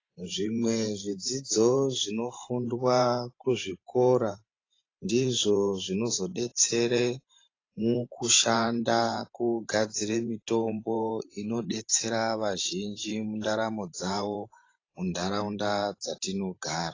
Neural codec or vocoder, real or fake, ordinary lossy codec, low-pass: vocoder, 44.1 kHz, 128 mel bands every 512 samples, BigVGAN v2; fake; AAC, 32 kbps; 7.2 kHz